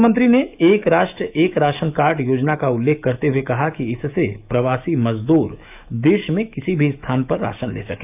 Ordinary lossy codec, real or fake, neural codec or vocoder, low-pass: AAC, 32 kbps; fake; autoencoder, 48 kHz, 128 numbers a frame, DAC-VAE, trained on Japanese speech; 3.6 kHz